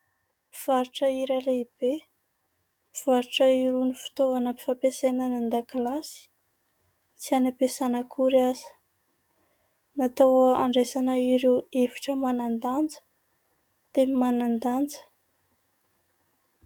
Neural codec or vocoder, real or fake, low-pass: codec, 44.1 kHz, 7.8 kbps, DAC; fake; 19.8 kHz